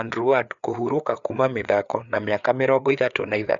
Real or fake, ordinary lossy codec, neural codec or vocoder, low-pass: fake; none; codec, 16 kHz, 4 kbps, FreqCodec, larger model; 7.2 kHz